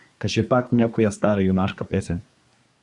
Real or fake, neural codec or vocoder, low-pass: fake; codec, 24 kHz, 1 kbps, SNAC; 10.8 kHz